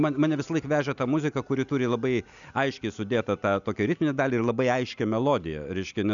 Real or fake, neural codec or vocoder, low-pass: real; none; 7.2 kHz